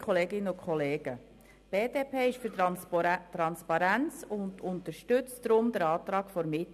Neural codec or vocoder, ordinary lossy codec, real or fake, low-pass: none; MP3, 96 kbps; real; 14.4 kHz